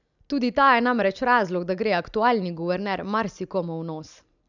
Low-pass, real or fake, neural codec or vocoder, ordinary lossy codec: 7.2 kHz; real; none; none